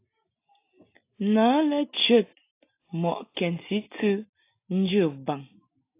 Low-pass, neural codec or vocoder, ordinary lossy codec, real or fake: 3.6 kHz; none; AAC, 24 kbps; real